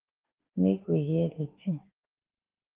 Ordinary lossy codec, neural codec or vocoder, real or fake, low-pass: Opus, 16 kbps; autoencoder, 48 kHz, 32 numbers a frame, DAC-VAE, trained on Japanese speech; fake; 3.6 kHz